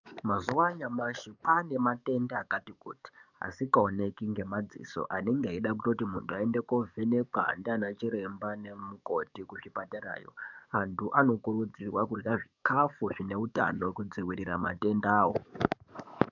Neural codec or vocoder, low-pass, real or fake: codec, 44.1 kHz, 7.8 kbps, DAC; 7.2 kHz; fake